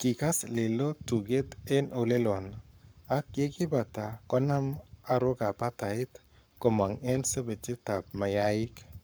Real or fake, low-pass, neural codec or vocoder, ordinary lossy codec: fake; none; codec, 44.1 kHz, 7.8 kbps, Pupu-Codec; none